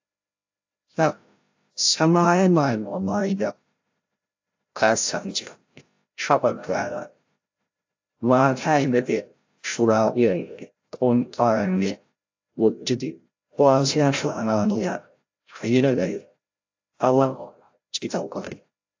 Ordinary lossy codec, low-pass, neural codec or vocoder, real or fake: AAC, 48 kbps; 7.2 kHz; codec, 16 kHz, 0.5 kbps, FreqCodec, larger model; fake